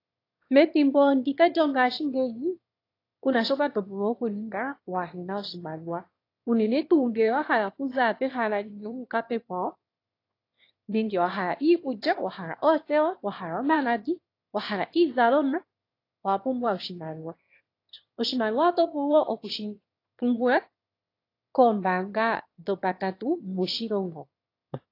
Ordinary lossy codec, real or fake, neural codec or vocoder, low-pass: AAC, 32 kbps; fake; autoencoder, 22.05 kHz, a latent of 192 numbers a frame, VITS, trained on one speaker; 5.4 kHz